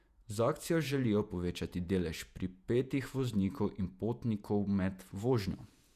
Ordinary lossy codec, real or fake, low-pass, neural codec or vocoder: none; fake; 14.4 kHz; vocoder, 48 kHz, 128 mel bands, Vocos